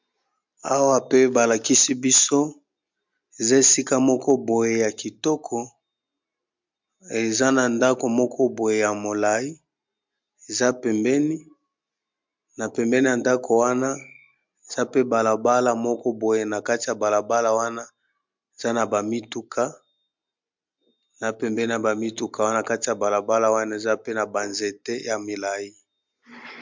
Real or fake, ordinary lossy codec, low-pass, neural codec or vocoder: real; MP3, 64 kbps; 7.2 kHz; none